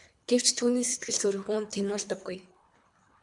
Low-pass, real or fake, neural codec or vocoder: 10.8 kHz; fake; codec, 24 kHz, 3 kbps, HILCodec